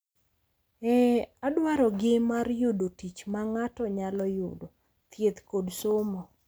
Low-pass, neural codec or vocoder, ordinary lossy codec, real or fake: none; none; none; real